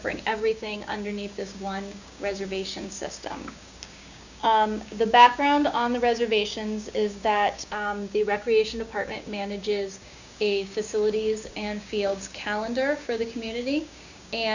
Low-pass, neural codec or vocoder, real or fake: 7.2 kHz; codec, 16 kHz, 6 kbps, DAC; fake